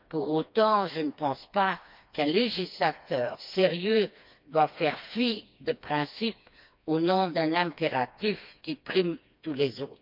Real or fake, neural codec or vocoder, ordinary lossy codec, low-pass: fake; codec, 16 kHz, 2 kbps, FreqCodec, smaller model; MP3, 48 kbps; 5.4 kHz